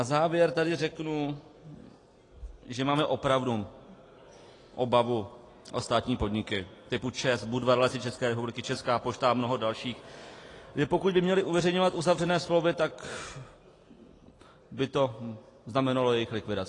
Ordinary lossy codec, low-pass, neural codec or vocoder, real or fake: AAC, 32 kbps; 10.8 kHz; none; real